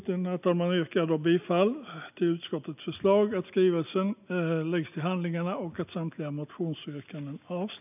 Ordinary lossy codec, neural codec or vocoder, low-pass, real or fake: none; none; 3.6 kHz; real